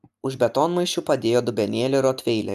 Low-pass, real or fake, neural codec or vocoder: 14.4 kHz; real; none